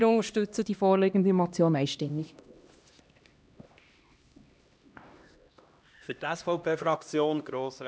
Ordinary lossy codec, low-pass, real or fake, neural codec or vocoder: none; none; fake; codec, 16 kHz, 1 kbps, X-Codec, HuBERT features, trained on LibriSpeech